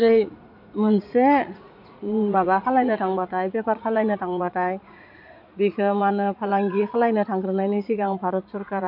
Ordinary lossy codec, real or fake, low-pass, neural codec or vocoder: none; fake; 5.4 kHz; vocoder, 44.1 kHz, 80 mel bands, Vocos